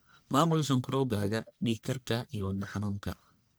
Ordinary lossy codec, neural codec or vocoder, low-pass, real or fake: none; codec, 44.1 kHz, 1.7 kbps, Pupu-Codec; none; fake